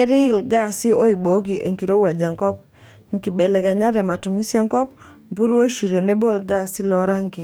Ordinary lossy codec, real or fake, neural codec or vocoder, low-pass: none; fake; codec, 44.1 kHz, 2.6 kbps, DAC; none